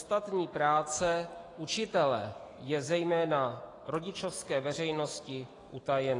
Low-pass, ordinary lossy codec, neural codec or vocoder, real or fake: 10.8 kHz; AAC, 32 kbps; autoencoder, 48 kHz, 128 numbers a frame, DAC-VAE, trained on Japanese speech; fake